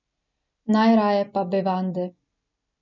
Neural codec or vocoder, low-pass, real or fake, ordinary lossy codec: none; 7.2 kHz; real; none